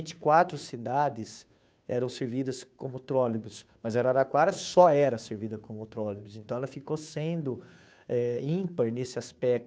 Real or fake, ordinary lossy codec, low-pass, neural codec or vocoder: fake; none; none; codec, 16 kHz, 2 kbps, FunCodec, trained on Chinese and English, 25 frames a second